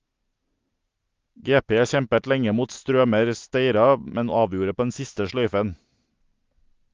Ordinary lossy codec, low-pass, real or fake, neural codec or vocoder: Opus, 32 kbps; 7.2 kHz; real; none